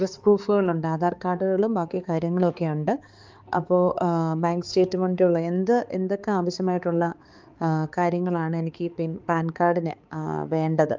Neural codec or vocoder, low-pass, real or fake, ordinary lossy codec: codec, 16 kHz, 4 kbps, X-Codec, HuBERT features, trained on balanced general audio; 7.2 kHz; fake; Opus, 32 kbps